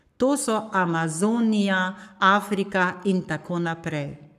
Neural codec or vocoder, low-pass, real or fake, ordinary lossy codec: codec, 44.1 kHz, 7.8 kbps, Pupu-Codec; 14.4 kHz; fake; none